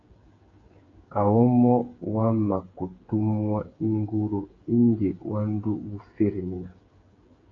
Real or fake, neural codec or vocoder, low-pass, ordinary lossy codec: fake; codec, 16 kHz, 8 kbps, FreqCodec, smaller model; 7.2 kHz; AAC, 32 kbps